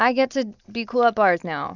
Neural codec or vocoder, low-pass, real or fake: none; 7.2 kHz; real